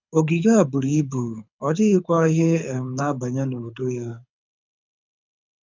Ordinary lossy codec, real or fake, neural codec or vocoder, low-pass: none; fake; codec, 24 kHz, 6 kbps, HILCodec; 7.2 kHz